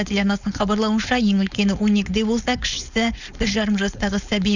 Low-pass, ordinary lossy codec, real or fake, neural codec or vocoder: 7.2 kHz; none; fake; codec, 16 kHz, 4.8 kbps, FACodec